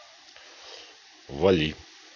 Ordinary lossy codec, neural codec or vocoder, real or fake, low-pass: none; none; real; 7.2 kHz